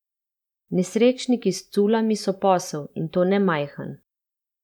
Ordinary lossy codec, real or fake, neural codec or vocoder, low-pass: none; fake; vocoder, 44.1 kHz, 128 mel bands every 512 samples, BigVGAN v2; 19.8 kHz